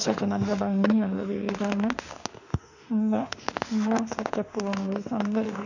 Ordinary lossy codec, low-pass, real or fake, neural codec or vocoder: none; 7.2 kHz; fake; autoencoder, 48 kHz, 32 numbers a frame, DAC-VAE, trained on Japanese speech